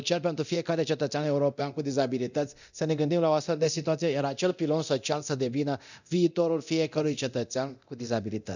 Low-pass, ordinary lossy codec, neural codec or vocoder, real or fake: 7.2 kHz; none; codec, 24 kHz, 0.9 kbps, DualCodec; fake